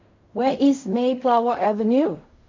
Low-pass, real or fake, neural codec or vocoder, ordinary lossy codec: 7.2 kHz; fake; codec, 16 kHz in and 24 kHz out, 0.4 kbps, LongCat-Audio-Codec, fine tuned four codebook decoder; MP3, 48 kbps